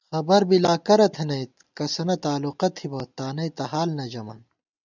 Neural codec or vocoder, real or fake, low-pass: none; real; 7.2 kHz